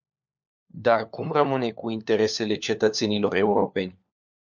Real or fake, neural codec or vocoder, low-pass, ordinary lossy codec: fake; codec, 16 kHz, 4 kbps, FunCodec, trained on LibriTTS, 50 frames a second; 7.2 kHz; MP3, 64 kbps